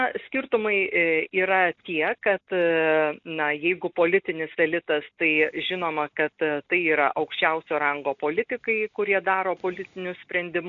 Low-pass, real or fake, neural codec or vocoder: 7.2 kHz; real; none